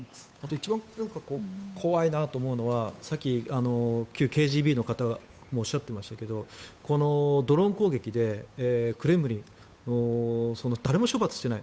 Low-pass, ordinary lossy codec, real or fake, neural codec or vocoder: none; none; fake; codec, 16 kHz, 8 kbps, FunCodec, trained on Chinese and English, 25 frames a second